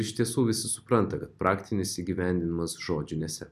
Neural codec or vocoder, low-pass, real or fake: none; 14.4 kHz; real